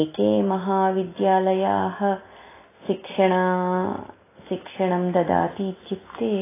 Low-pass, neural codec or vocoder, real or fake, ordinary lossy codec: 3.6 kHz; none; real; AAC, 16 kbps